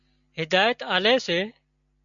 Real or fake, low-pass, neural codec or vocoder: real; 7.2 kHz; none